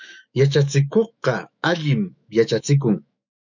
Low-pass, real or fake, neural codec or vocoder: 7.2 kHz; fake; autoencoder, 48 kHz, 128 numbers a frame, DAC-VAE, trained on Japanese speech